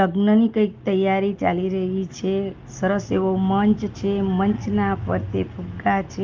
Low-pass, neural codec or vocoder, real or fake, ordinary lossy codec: 7.2 kHz; none; real; Opus, 32 kbps